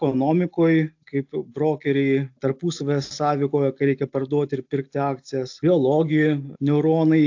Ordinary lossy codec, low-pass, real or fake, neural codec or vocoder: AAC, 48 kbps; 7.2 kHz; real; none